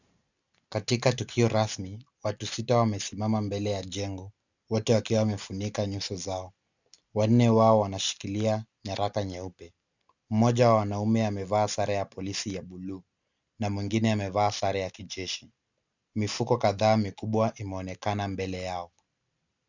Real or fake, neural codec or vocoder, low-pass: real; none; 7.2 kHz